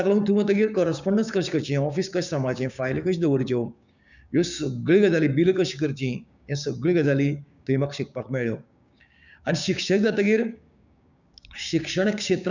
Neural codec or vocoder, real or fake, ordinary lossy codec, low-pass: codec, 16 kHz, 6 kbps, DAC; fake; none; 7.2 kHz